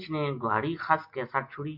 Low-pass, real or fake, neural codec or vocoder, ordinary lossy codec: 5.4 kHz; real; none; MP3, 48 kbps